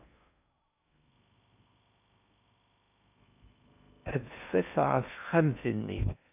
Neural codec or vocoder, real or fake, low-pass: codec, 16 kHz in and 24 kHz out, 0.6 kbps, FocalCodec, streaming, 2048 codes; fake; 3.6 kHz